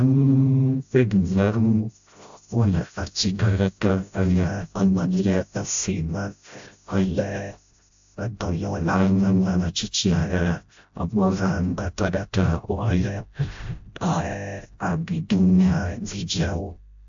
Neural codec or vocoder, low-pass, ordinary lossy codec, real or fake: codec, 16 kHz, 0.5 kbps, FreqCodec, smaller model; 7.2 kHz; AAC, 64 kbps; fake